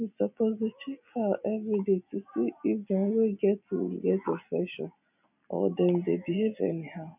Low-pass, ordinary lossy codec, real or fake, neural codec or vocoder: 3.6 kHz; none; real; none